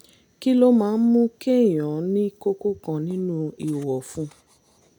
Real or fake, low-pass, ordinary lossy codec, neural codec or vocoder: real; 19.8 kHz; none; none